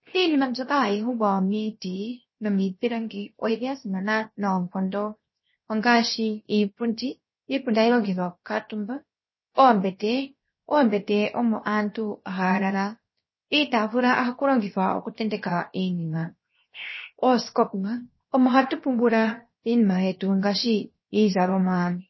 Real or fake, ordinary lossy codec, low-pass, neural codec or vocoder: fake; MP3, 24 kbps; 7.2 kHz; codec, 16 kHz, 0.7 kbps, FocalCodec